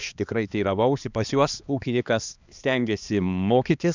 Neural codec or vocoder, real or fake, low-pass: codec, 16 kHz, 2 kbps, X-Codec, HuBERT features, trained on balanced general audio; fake; 7.2 kHz